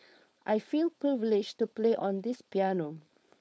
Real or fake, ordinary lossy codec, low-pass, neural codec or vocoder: fake; none; none; codec, 16 kHz, 4.8 kbps, FACodec